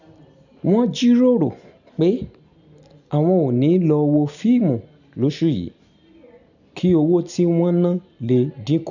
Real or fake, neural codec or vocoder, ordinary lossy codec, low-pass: real; none; none; 7.2 kHz